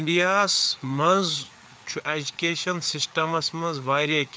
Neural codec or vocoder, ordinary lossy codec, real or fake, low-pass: codec, 16 kHz, 4 kbps, FreqCodec, larger model; none; fake; none